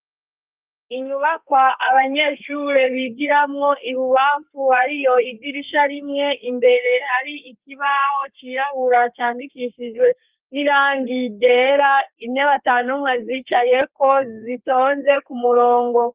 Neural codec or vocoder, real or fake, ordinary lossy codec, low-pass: codec, 32 kHz, 1.9 kbps, SNAC; fake; Opus, 24 kbps; 3.6 kHz